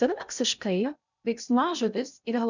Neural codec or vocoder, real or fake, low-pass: codec, 16 kHz in and 24 kHz out, 0.6 kbps, FocalCodec, streaming, 2048 codes; fake; 7.2 kHz